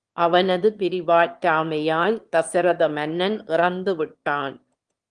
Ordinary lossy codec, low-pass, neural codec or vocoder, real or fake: Opus, 24 kbps; 9.9 kHz; autoencoder, 22.05 kHz, a latent of 192 numbers a frame, VITS, trained on one speaker; fake